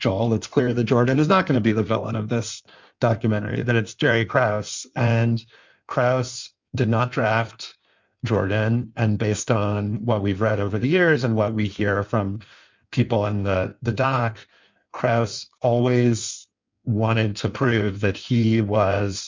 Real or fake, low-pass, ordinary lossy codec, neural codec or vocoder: fake; 7.2 kHz; MP3, 64 kbps; codec, 16 kHz in and 24 kHz out, 1.1 kbps, FireRedTTS-2 codec